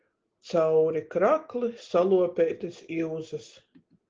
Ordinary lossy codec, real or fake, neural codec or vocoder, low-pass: Opus, 16 kbps; real; none; 7.2 kHz